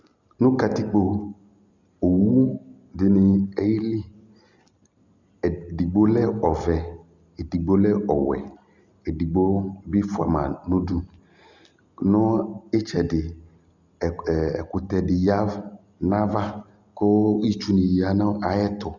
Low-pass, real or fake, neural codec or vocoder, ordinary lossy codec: 7.2 kHz; real; none; Opus, 64 kbps